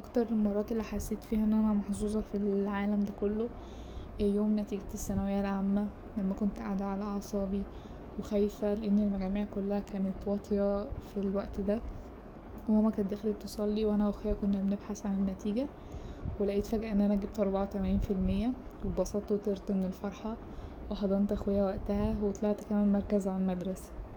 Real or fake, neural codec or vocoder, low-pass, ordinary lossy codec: fake; codec, 44.1 kHz, 7.8 kbps, DAC; none; none